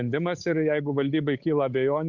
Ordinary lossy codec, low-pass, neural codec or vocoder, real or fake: Opus, 64 kbps; 7.2 kHz; codec, 16 kHz, 8 kbps, FunCodec, trained on Chinese and English, 25 frames a second; fake